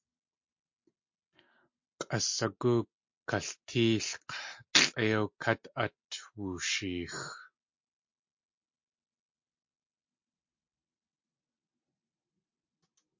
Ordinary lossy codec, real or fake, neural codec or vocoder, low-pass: MP3, 48 kbps; real; none; 7.2 kHz